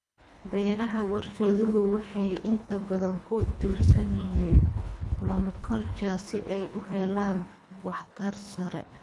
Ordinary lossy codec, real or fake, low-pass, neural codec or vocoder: none; fake; none; codec, 24 kHz, 1.5 kbps, HILCodec